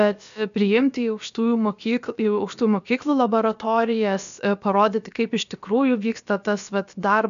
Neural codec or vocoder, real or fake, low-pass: codec, 16 kHz, about 1 kbps, DyCAST, with the encoder's durations; fake; 7.2 kHz